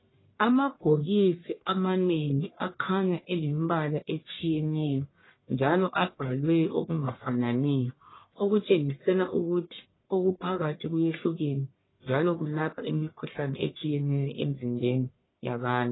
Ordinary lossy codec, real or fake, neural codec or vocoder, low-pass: AAC, 16 kbps; fake; codec, 44.1 kHz, 1.7 kbps, Pupu-Codec; 7.2 kHz